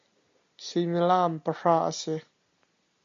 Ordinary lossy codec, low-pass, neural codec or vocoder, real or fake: MP3, 48 kbps; 7.2 kHz; none; real